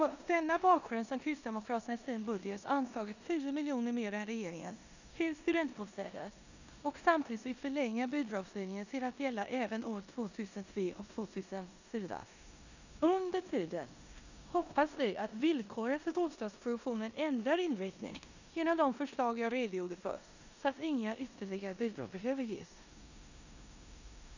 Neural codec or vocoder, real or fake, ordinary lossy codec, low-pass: codec, 16 kHz in and 24 kHz out, 0.9 kbps, LongCat-Audio-Codec, four codebook decoder; fake; none; 7.2 kHz